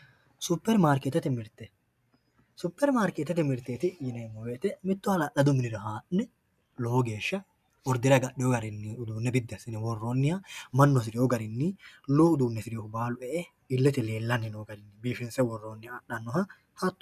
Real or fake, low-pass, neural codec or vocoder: real; 14.4 kHz; none